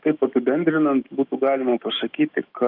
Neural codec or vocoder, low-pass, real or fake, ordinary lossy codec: autoencoder, 48 kHz, 128 numbers a frame, DAC-VAE, trained on Japanese speech; 5.4 kHz; fake; Opus, 32 kbps